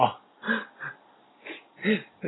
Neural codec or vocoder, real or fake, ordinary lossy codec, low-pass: none; real; AAC, 16 kbps; 7.2 kHz